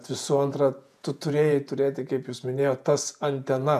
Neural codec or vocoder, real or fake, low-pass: vocoder, 48 kHz, 128 mel bands, Vocos; fake; 14.4 kHz